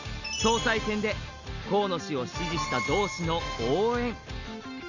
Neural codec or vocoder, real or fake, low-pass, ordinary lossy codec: none; real; 7.2 kHz; none